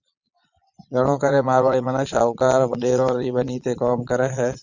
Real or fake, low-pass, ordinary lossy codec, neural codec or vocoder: fake; 7.2 kHz; Opus, 64 kbps; vocoder, 22.05 kHz, 80 mel bands, Vocos